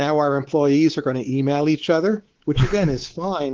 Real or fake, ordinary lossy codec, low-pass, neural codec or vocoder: fake; Opus, 16 kbps; 7.2 kHz; codec, 24 kHz, 3.1 kbps, DualCodec